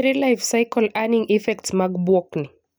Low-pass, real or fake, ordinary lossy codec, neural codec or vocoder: none; real; none; none